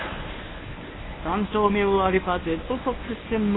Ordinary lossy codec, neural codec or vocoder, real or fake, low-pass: AAC, 16 kbps; codec, 24 kHz, 0.9 kbps, WavTokenizer, medium speech release version 1; fake; 7.2 kHz